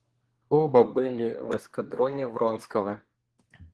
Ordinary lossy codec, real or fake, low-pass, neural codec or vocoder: Opus, 16 kbps; fake; 10.8 kHz; codec, 24 kHz, 1 kbps, SNAC